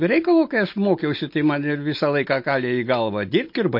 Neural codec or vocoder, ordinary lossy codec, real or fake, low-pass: none; MP3, 32 kbps; real; 5.4 kHz